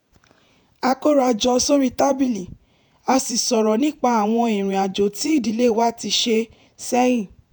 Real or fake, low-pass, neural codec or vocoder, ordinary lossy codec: fake; none; vocoder, 48 kHz, 128 mel bands, Vocos; none